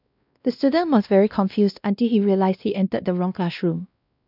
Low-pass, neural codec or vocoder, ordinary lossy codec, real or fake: 5.4 kHz; codec, 16 kHz, 1 kbps, X-Codec, WavLM features, trained on Multilingual LibriSpeech; none; fake